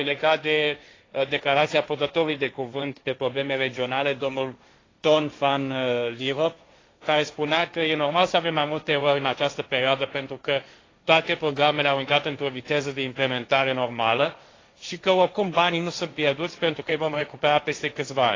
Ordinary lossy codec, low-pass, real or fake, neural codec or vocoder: AAC, 32 kbps; 7.2 kHz; fake; codec, 16 kHz, 1.1 kbps, Voila-Tokenizer